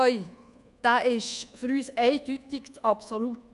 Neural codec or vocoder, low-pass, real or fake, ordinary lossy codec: codec, 24 kHz, 1.2 kbps, DualCodec; 10.8 kHz; fake; none